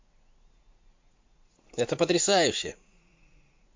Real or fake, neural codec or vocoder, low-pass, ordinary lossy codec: fake; vocoder, 44.1 kHz, 80 mel bands, Vocos; 7.2 kHz; MP3, 48 kbps